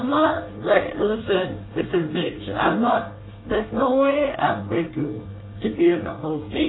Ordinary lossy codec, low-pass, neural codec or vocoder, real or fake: AAC, 16 kbps; 7.2 kHz; codec, 24 kHz, 1 kbps, SNAC; fake